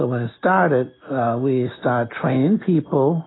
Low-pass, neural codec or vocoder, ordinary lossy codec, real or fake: 7.2 kHz; none; AAC, 16 kbps; real